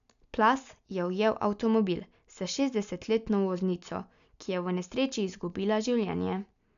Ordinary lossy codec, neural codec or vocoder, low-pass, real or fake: none; none; 7.2 kHz; real